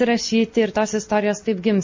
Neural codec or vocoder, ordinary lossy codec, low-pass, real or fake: none; MP3, 32 kbps; 7.2 kHz; real